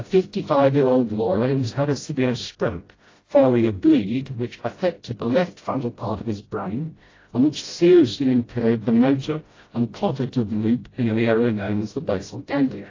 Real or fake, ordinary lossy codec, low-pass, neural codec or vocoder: fake; AAC, 32 kbps; 7.2 kHz; codec, 16 kHz, 0.5 kbps, FreqCodec, smaller model